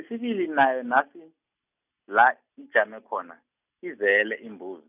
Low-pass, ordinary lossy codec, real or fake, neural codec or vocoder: 3.6 kHz; none; real; none